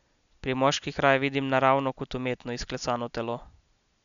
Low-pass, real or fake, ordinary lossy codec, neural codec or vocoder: 7.2 kHz; real; none; none